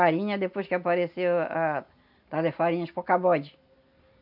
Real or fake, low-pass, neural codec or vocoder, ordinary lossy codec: real; 5.4 kHz; none; none